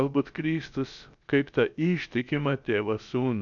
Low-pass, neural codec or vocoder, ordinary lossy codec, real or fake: 7.2 kHz; codec, 16 kHz, about 1 kbps, DyCAST, with the encoder's durations; MP3, 96 kbps; fake